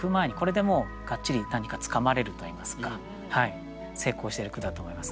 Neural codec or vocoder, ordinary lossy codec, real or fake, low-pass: none; none; real; none